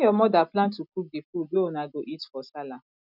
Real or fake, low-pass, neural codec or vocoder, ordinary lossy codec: real; 5.4 kHz; none; none